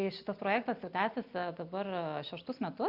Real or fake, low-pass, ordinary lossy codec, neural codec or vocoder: real; 5.4 kHz; Opus, 64 kbps; none